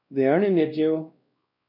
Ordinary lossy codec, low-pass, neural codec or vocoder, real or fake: MP3, 32 kbps; 5.4 kHz; codec, 16 kHz, 1 kbps, X-Codec, WavLM features, trained on Multilingual LibriSpeech; fake